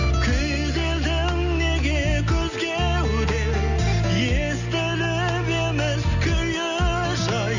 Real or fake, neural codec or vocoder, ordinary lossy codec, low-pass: real; none; none; 7.2 kHz